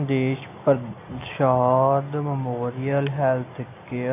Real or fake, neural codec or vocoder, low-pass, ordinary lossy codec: real; none; 3.6 kHz; AAC, 24 kbps